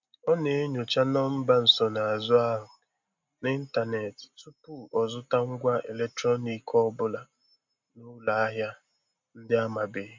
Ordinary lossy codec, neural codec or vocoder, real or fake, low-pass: MP3, 64 kbps; none; real; 7.2 kHz